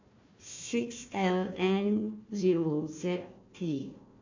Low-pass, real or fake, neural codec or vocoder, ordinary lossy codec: 7.2 kHz; fake; codec, 16 kHz, 1 kbps, FunCodec, trained on Chinese and English, 50 frames a second; MP3, 64 kbps